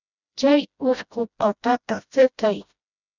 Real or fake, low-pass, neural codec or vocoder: fake; 7.2 kHz; codec, 16 kHz, 0.5 kbps, FreqCodec, smaller model